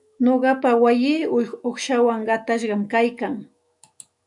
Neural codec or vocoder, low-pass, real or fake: autoencoder, 48 kHz, 128 numbers a frame, DAC-VAE, trained on Japanese speech; 10.8 kHz; fake